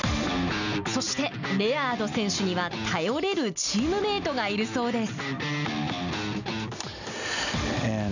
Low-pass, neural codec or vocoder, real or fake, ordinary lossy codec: 7.2 kHz; none; real; none